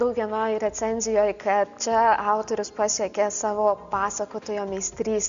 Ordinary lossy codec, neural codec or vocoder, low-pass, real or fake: Opus, 64 kbps; none; 7.2 kHz; real